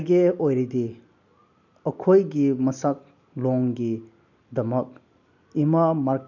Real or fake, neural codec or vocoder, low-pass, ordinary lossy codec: real; none; 7.2 kHz; none